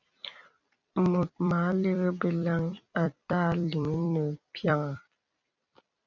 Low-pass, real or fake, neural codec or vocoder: 7.2 kHz; fake; vocoder, 44.1 kHz, 128 mel bands every 256 samples, BigVGAN v2